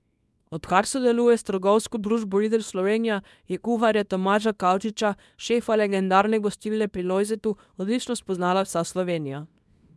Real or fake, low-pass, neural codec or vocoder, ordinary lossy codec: fake; none; codec, 24 kHz, 0.9 kbps, WavTokenizer, small release; none